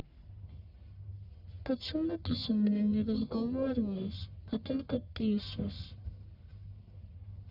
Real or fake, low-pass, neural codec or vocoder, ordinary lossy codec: fake; 5.4 kHz; codec, 44.1 kHz, 1.7 kbps, Pupu-Codec; none